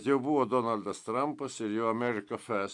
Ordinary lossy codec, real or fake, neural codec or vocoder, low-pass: AAC, 64 kbps; real; none; 10.8 kHz